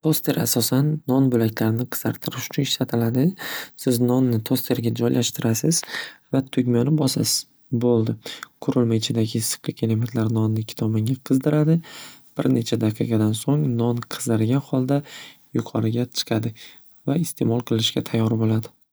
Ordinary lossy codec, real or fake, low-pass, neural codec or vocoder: none; real; none; none